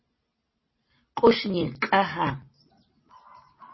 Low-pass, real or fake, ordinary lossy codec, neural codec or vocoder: 7.2 kHz; real; MP3, 24 kbps; none